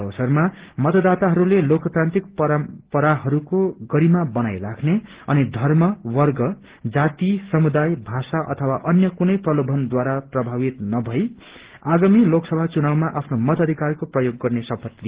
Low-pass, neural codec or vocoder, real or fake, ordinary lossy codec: 3.6 kHz; none; real; Opus, 16 kbps